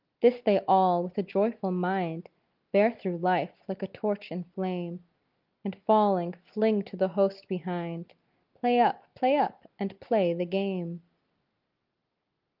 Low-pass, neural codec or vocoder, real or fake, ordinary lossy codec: 5.4 kHz; none; real; Opus, 24 kbps